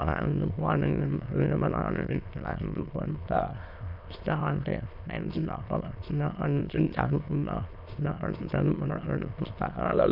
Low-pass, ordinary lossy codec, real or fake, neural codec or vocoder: 5.4 kHz; none; fake; autoencoder, 22.05 kHz, a latent of 192 numbers a frame, VITS, trained on many speakers